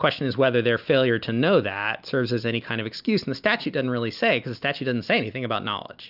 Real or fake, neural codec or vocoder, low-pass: real; none; 5.4 kHz